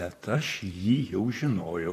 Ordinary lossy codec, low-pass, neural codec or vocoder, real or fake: AAC, 48 kbps; 14.4 kHz; vocoder, 44.1 kHz, 128 mel bands, Pupu-Vocoder; fake